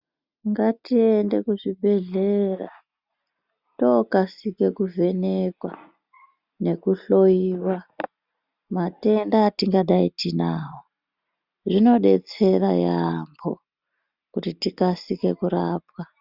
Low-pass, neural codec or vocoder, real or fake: 5.4 kHz; none; real